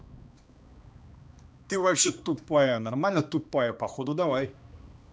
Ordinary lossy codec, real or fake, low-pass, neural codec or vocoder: none; fake; none; codec, 16 kHz, 2 kbps, X-Codec, HuBERT features, trained on balanced general audio